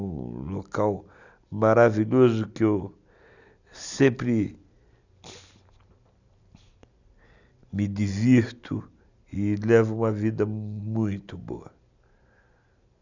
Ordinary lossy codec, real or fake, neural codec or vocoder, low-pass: none; real; none; 7.2 kHz